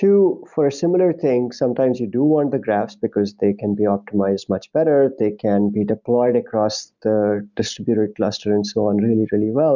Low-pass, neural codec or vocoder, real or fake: 7.2 kHz; none; real